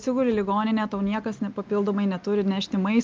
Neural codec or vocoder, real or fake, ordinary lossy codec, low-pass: none; real; Opus, 24 kbps; 7.2 kHz